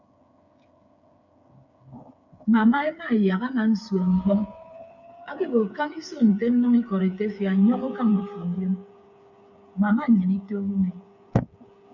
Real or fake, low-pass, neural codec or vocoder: fake; 7.2 kHz; codec, 16 kHz, 8 kbps, FreqCodec, smaller model